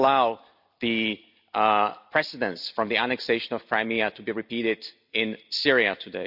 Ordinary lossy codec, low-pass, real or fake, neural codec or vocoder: none; 5.4 kHz; fake; vocoder, 44.1 kHz, 128 mel bands every 256 samples, BigVGAN v2